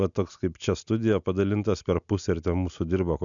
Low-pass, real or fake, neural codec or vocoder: 7.2 kHz; real; none